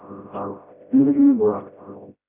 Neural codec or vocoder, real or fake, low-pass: codec, 16 kHz, 0.5 kbps, FreqCodec, smaller model; fake; 3.6 kHz